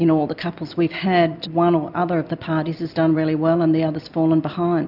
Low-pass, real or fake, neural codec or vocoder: 5.4 kHz; real; none